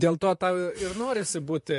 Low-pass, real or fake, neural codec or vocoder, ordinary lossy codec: 14.4 kHz; fake; vocoder, 44.1 kHz, 128 mel bands, Pupu-Vocoder; MP3, 48 kbps